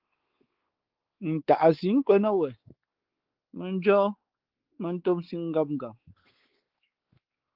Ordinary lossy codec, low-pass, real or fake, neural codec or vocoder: Opus, 16 kbps; 5.4 kHz; fake; codec, 16 kHz, 4 kbps, X-Codec, WavLM features, trained on Multilingual LibriSpeech